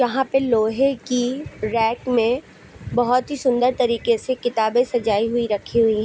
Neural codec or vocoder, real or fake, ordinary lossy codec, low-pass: none; real; none; none